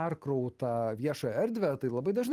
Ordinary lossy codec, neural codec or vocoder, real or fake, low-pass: Opus, 24 kbps; none; real; 14.4 kHz